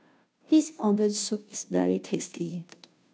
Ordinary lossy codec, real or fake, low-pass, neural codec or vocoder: none; fake; none; codec, 16 kHz, 0.5 kbps, FunCodec, trained on Chinese and English, 25 frames a second